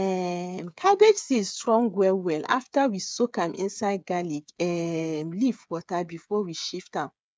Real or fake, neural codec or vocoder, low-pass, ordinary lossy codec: fake; codec, 16 kHz, 8 kbps, FreqCodec, smaller model; none; none